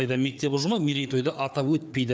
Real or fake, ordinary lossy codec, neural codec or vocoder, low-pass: fake; none; codec, 16 kHz, 4 kbps, FreqCodec, larger model; none